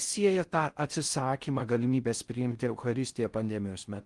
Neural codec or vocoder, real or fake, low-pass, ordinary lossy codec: codec, 16 kHz in and 24 kHz out, 0.6 kbps, FocalCodec, streaming, 4096 codes; fake; 10.8 kHz; Opus, 24 kbps